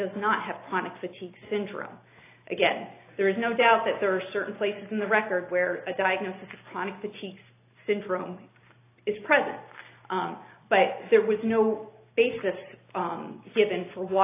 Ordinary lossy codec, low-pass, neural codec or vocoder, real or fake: AAC, 24 kbps; 3.6 kHz; none; real